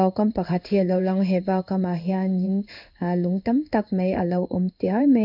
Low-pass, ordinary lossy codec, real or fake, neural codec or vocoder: 5.4 kHz; none; fake; codec, 16 kHz in and 24 kHz out, 1 kbps, XY-Tokenizer